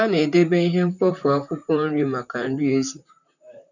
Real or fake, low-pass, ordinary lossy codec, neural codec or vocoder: fake; 7.2 kHz; none; vocoder, 44.1 kHz, 128 mel bands, Pupu-Vocoder